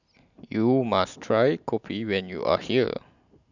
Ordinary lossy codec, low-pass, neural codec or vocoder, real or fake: none; 7.2 kHz; none; real